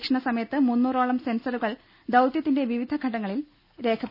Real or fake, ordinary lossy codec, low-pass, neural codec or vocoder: real; none; 5.4 kHz; none